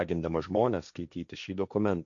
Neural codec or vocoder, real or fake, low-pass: codec, 16 kHz, 1.1 kbps, Voila-Tokenizer; fake; 7.2 kHz